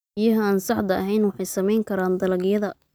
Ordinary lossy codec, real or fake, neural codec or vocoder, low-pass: none; real; none; none